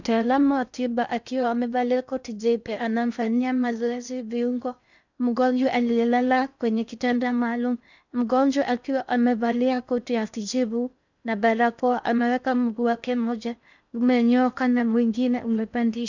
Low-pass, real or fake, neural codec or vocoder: 7.2 kHz; fake; codec, 16 kHz in and 24 kHz out, 0.6 kbps, FocalCodec, streaming, 2048 codes